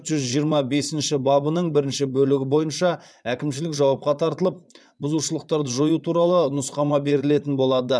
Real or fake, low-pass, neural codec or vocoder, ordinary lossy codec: fake; none; vocoder, 22.05 kHz, 80 mel bands, Vocos; none